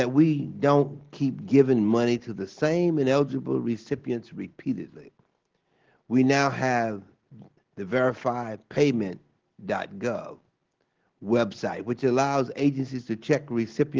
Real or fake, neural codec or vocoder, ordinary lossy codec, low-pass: real; none; Opus, 16 kbps; 7.2 kHz